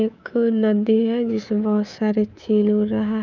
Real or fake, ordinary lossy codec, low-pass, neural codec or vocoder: fake; none; 7.2 kHz; codec, 24 kHz, 3.1 kbps, DualCodec